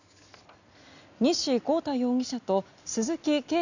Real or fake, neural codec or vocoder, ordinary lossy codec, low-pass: real; none; none; 7.2 kHz